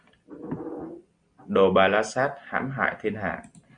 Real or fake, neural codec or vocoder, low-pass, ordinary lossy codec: real; none; 9.9 kHz; Opus, 64 kbps